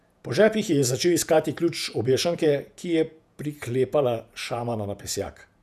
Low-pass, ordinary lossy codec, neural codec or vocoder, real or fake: 14.4 kHz; none; vocoder, 48 kHz, 128 mel bands, Vocos; fake